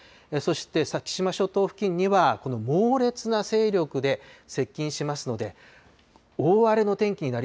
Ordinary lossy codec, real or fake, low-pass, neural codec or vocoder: none; real; none; none